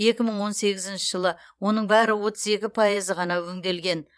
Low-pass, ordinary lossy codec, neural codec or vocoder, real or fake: none; none; vocoder, 22.05 kHz, 80 mel bands, Vocos; fake